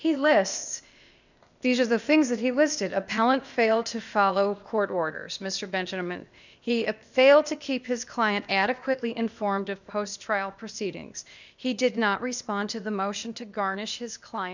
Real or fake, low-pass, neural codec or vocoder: fake; 7.2 kHz; codec, 16 kHz, 0.8 kbps, ZipCodec